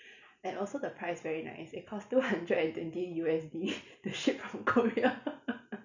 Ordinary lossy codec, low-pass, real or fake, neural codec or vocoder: none; 7.2 kHz; real; none